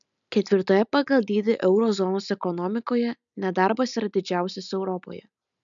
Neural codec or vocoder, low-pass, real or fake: none; 7.2 kHz; real